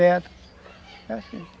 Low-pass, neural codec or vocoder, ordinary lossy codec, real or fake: none; none; none; real